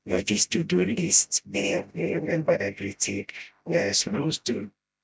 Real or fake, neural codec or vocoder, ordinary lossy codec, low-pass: fake; codec, 16 kHz, 0.5 kbps, FreqCodec, smaller model; none; none